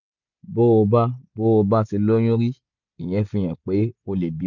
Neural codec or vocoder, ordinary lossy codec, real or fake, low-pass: none; none; real; 7.2 kHz